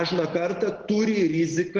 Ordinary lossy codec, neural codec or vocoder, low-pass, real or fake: Opus, 16 kbps; none; 7.2 kHz; real